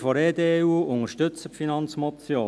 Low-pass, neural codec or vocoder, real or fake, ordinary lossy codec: none; none; real; none